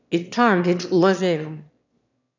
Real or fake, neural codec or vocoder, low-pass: fake; autoencoder, 22.05 kHz, a latent of 192 numbers a frame, VITS, trained on one speaker; 7.2 kHz